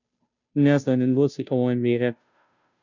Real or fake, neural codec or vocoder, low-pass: fake; codec, 16 kHz, 0.5 kbps, FunCodec, trained on Chinese and English, 25 frames a second; 7.2 kHz